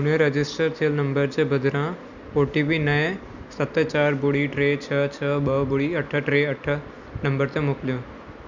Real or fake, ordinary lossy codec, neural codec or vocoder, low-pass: real; none; none; 7.2 kHz